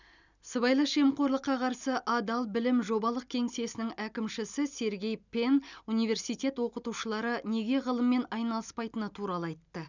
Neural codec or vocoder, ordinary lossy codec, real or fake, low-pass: none; none; real; 7.2 kHz